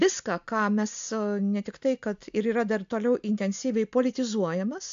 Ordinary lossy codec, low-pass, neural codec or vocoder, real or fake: AAC, 64 kbps; 7.2 kHz; none; real